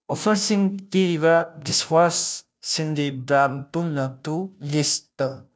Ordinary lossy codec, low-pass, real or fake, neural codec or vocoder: none; none; fake; codec, 16 kHz, 0.5 kbps, FunCodec, trained on Chinese and English, 25 frames a second